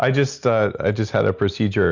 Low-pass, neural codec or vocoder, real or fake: 7.2 kHz; none; real